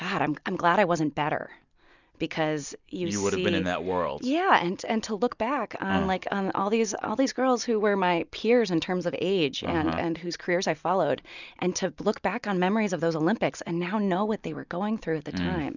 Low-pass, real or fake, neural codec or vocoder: 7.2 kHz; real; none